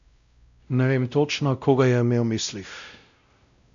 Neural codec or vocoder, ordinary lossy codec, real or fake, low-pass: codec, 16 kHz, 0.5 kbps, X-Codec, WavLM features, trained on Multilingual LibriSpeech; none; fake; 7.2 kHz